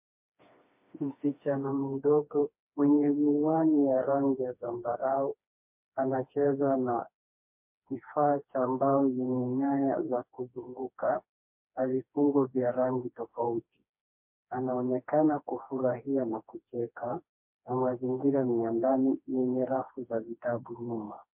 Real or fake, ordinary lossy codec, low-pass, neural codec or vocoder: fake; MP3, 32 kbps; 3.6 kHz; codec, 16 kHz, 2 kbps, FreqCodec, smaller model